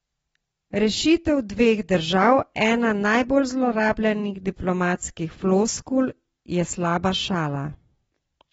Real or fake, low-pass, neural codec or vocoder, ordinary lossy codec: real; 10.8 kHz; none; AAC, 24 kbps